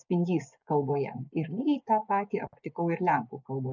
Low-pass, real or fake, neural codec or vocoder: 7.2 kHz; fake; vocoder, 24 kHz, 100 mel bands, Vocos